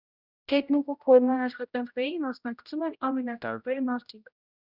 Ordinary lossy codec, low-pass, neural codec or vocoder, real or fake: Opus, 64 kbps; 5.4 kHz; codec, 16 kHz, 0.5 kbps, X-Codec, HuBERT features, trained on general audio; fake